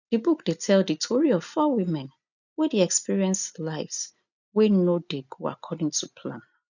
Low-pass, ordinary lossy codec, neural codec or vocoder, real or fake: 7.2 kHz; none; none; real